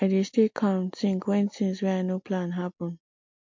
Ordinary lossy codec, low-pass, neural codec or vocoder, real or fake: MP3, 48 kbps; 7.2 kHz; none; real